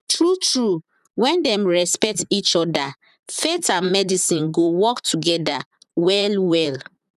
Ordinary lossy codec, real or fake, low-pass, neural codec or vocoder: none; fake; 14.4 kHz; vocoder, 44.1 kHz, 128 mel bands, Pupu-Vocoder